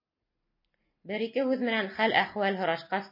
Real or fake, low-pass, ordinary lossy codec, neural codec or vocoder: real; 5.4 kHz; MP3, 24 kbps; none